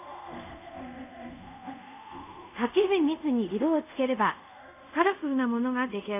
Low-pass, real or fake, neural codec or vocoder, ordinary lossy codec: 3.6 kHz; fake; codec, 24 kHz, 0.5 kbps, DualCodec; AAC, 32 kbps